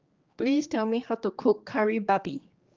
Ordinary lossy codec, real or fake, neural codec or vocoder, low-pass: Opus, 32 kbps; fake; codec, 16 kHz, 2 kbps, X-Codec, HuBERT features, trained on general audio; 7.2 kHz